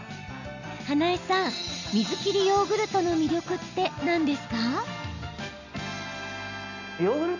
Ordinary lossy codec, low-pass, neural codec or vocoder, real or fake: none; 7.2 kHz; none; real